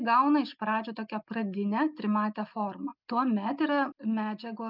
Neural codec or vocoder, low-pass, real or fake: none; 5.4 kHz; real